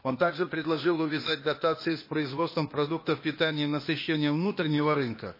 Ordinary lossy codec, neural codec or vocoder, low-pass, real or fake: MP3, 24 kbps; codec, 16 kHz, 0.8 kbps, ZipCodec; 5.4 kHz; fake